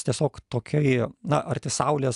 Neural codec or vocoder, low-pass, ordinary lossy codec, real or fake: none; 10.8 kHz; AAC, 96 kbps; real